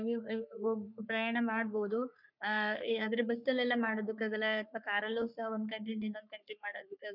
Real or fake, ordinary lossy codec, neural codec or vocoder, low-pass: fake; none; codec, 44.1 kHz, 3.4 kbps, Pupu-Codec; 5.4 kHz